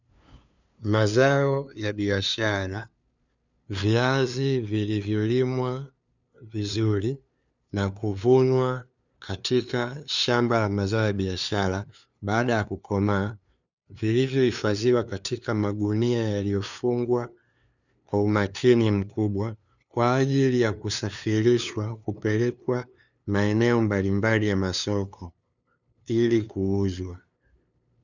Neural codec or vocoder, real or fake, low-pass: codec, 16 kHz, 2 kbps, FunCodec, trained on LibriTTS, 25 frames a second; fake; 7.2 kHz